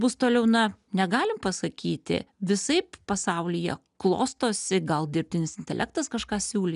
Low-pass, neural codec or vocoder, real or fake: 10.8 kHz; none; real